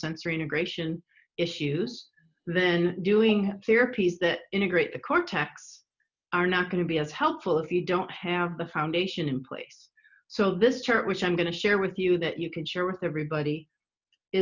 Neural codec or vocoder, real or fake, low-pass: none; real; 7.2 kHz